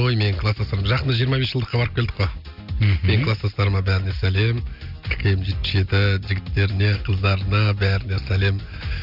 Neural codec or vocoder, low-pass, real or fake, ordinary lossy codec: none; 5.4 kHz; real; none